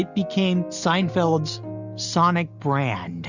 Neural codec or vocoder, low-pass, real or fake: none; 7.2 kHz; real